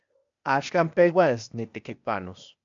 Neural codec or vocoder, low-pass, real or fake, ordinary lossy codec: codec, 16 kHz, 0.8 kbps, ZipCodec; 7.2 kHz; fake; AAC, 64 kbps